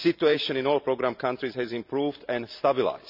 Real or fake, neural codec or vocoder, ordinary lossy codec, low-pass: fake; vocoder, 44.1 kHz, 128 mel bands every 512 samples, BigVGAN v2; none; 5.4 kHz